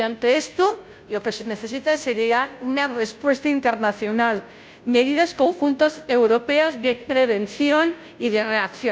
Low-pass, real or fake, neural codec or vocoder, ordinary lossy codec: none; fake; codec, 16 kHz, 0.5 kbps, FunCodec, trained on Chinese and English, 25 frames a second; none